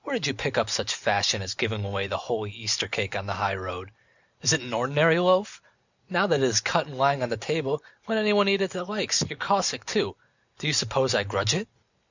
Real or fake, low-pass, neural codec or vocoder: real; 7.2 kHz; none